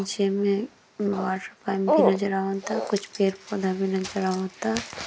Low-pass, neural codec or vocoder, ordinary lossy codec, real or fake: none; none; none; real